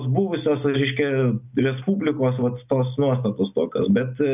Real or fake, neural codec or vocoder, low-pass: real; none; 3.6 kHz